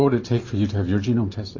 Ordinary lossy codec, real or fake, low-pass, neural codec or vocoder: MP3, 32 kbps; fake; 7.2 kHz; vocoder, 44.1 kHz, 128 mel bands every 512 samples, BigVGAN v2